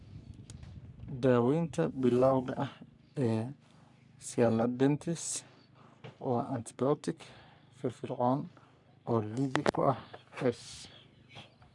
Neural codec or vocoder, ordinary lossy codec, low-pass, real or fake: codec, 44.1 kHz, 3.4 kbps, Pupu-Codec; none; 10.8 kHz; fake